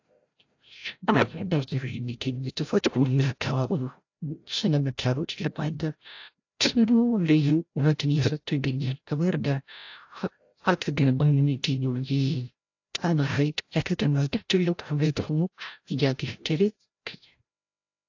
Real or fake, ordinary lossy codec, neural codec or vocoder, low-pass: fake; AAC, 48 kbps; codec, 16 kHz, 0.5 kbps, FreqCodec, larger model; 7.2 kHz